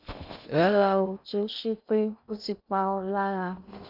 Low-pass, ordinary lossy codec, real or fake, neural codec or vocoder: 5.4 kHz; none; fake; codec, 16 kHz in and 24 kHz out, 0.6 kbps, FocalCodec, streaming, 2048 codes